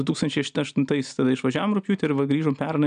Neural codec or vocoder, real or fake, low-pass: none; real; 9.9 kHz